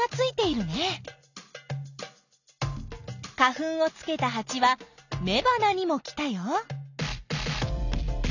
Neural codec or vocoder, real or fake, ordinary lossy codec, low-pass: none; real; none; 7.2 kHz